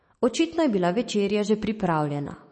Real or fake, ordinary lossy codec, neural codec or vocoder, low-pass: fake; MP3, 32 kbps; autoencoder, 48 kHz, 128 numbers a frame, DAC-VAE, trained on Japanese speech; 10.8 kHz